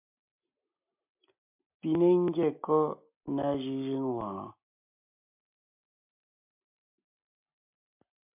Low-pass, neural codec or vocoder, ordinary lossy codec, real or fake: 3.6 kHz; none; MP3, 32 kbps; real